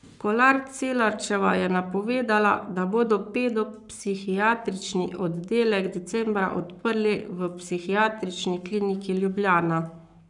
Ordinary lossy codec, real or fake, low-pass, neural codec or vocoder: none; fake; 10.8 kHz; codec, 44.1 kHz, 7.8 kbps, Pupu-Codec